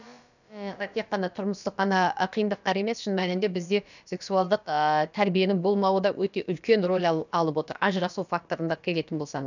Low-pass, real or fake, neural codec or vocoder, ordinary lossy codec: 7.2 kHz; fake; codec, 16 kHz, about 1 kbps, DyCAST, with the encoder's durations; none